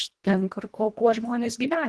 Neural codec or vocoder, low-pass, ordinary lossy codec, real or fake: codec, 24 kHz, 1.5 kbps, HILCodec; 10.8 kHz; Opus, 16 kbps; fake